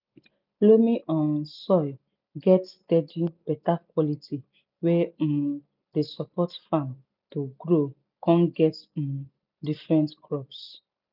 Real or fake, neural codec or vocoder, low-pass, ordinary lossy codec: real; none; 5.4 kHz; none